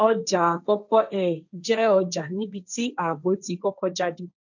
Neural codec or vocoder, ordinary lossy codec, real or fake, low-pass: codec, 16 kHz, 1.1 kbps, Voila-Tokenizer; none; fake; 7.2 kHz